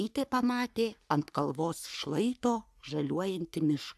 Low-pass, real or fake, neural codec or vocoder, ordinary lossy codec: 14.4 kHz; fake; codec, 44.1 kHz, 3.4 kbps, Pupu-Codec; AAC, 96 kbps